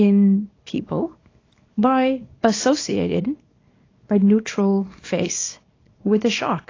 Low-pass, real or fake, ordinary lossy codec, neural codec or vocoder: 7.2 kHz; fake; AAC, 32 kbps; codec, 24 kHz, 0.9 kbps, WavTokenizer, small release